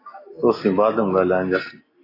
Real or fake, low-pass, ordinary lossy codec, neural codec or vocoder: real; 5.4 kHz; AAC, 24 kbps; none